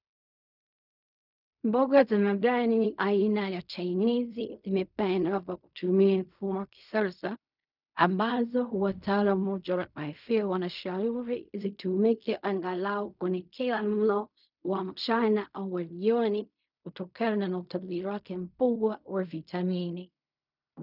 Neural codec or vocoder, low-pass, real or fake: codec, 16 kHz in and 24 kHz out, 0.4 kbps, LongCat-Audio-Codec, fine tuned four codebook decoder; 5.4 kHz; fake